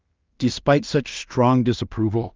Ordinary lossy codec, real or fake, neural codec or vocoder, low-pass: Opus, 32 kbps; fake; codec, 16 kHz in and 24 kHz out, 0.4 kbps, LongCat-Audio-Codec, two codebook decoder; 7.2 kHz